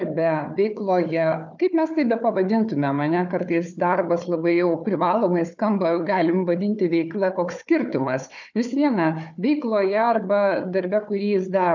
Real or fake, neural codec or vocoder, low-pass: fake; codec, 16 kHz, 4 kbps, FunCodec, trained on Chinese and English, 50 frames a second; 7.2 kHz